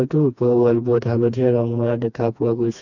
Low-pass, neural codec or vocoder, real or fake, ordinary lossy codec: 7.2 kHz; codec, 16 kHz, 2 kbps, FreqCodec, smaller model; fake; none